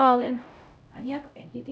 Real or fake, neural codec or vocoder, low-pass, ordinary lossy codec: fake; codec, 16 kHz, 0.5 kbps, X-Codec, HuBERT features, trained on LibriSpeech; none; none